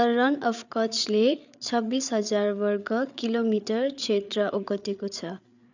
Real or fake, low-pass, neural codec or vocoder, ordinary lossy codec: fake; 7.2 kHz; codec, 16 kHz, 8 kbps, FreqCodec, larger model; none